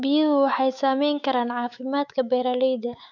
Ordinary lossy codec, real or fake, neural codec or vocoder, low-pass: none; fake; autoencoder, 48 kHz, 128 numbers a frame, DAC-VAE, trained on Japanese speech; 7.2 kHz